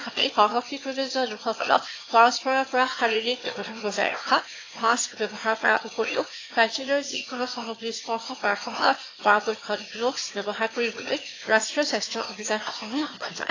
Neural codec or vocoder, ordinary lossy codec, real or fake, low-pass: autoencoder, 22.05 kHz, a latent of 192 numbers a frame, VITS, trained on one speaker; AAC, 32 kbps; fake; 7.2 kHz